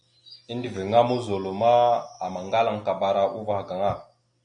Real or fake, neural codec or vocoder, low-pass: real; none; 9.9 kHz